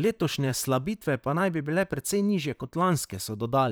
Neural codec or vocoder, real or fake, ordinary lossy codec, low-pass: vocoder, 44.1 kHz, 128 mel bands, Pupu-Vocoder; fake; none; none